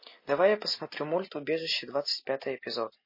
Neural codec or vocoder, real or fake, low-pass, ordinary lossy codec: none; real; 5.4 kHz; MP3, 24 kbps